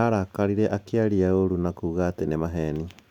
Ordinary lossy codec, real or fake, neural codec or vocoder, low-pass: none; real; none; 19.8 kHz